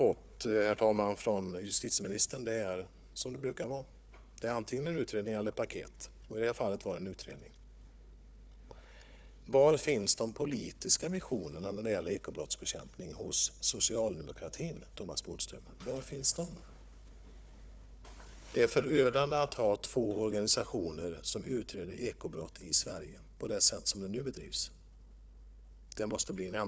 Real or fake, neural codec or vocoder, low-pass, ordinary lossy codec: fake; codec, 16 kHz, 4 kbps, FunCodec, trained on Chinese and English, 50 frames a second; none; none